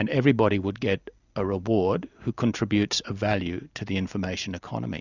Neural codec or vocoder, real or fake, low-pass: none; real; 7.2 kHz